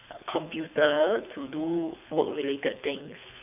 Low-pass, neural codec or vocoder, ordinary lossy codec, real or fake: 3.6 kHz; codec, 24 kHz, 3 kbps, HILCodec; none; fake